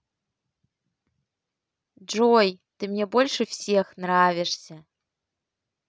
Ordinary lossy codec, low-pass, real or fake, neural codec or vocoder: none; none; real; none